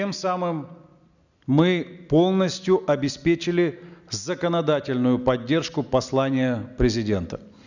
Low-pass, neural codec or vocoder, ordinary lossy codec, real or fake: 7.2 kHz; none; none; real